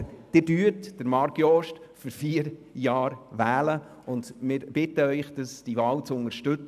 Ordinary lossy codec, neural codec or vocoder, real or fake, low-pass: none; none; real; 14.4 kHz